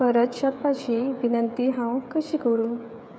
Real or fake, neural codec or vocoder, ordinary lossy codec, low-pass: fake; codec, 16 kHz, 16 kbps, FreqCodec, smaller model; none; none